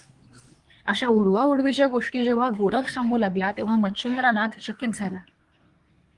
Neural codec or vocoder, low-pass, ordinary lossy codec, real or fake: codec, 24 kHz, 1 kbps, SNAC; 10.8 kHz; Opus, 24 kbps; fake